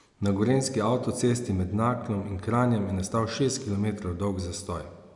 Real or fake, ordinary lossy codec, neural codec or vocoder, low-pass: real; none; none; 10.8 kHz